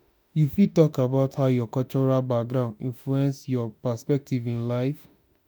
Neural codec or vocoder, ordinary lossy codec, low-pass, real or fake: autoencoder, 48 kHz, 32 numbers a frame, DAC-VAE, trained on Japanese speech; none; none; fake